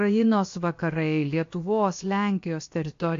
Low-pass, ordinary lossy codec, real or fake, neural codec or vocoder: 7.2 kHz; AAC, 48 kbps; fake; codec, 16 kHz, about 1 kbps, DyCAST, with the encoder's durations